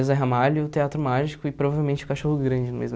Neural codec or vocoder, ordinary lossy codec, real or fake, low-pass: none; none; real; none